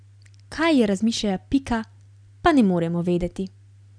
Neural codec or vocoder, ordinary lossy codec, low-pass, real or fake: none; none; 9.9 kHz; real